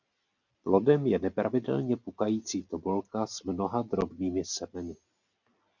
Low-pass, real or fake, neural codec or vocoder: 7.2 kHz; real; none